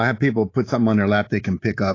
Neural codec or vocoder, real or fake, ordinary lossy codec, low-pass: codec, 24 kHz, 3.1 kbps, DualCodec; fake; AAC, 32 kbps; 7.2 kHz